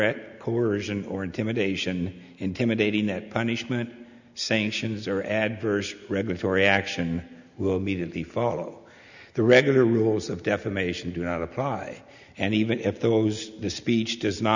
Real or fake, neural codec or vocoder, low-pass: real; none; 7.2 kHz